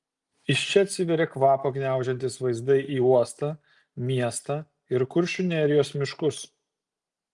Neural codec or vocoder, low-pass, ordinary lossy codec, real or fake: none; 10.8 kHz; Opus, 32 kbps; real